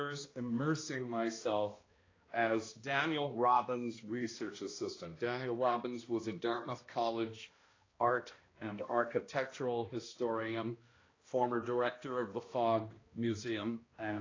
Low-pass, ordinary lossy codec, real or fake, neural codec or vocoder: 7.2 kHz; AAC, 32 kbps; fake; codec, 16 kHz, 1 kbps, X-Codec, HuBERT features, trained on balanced general audio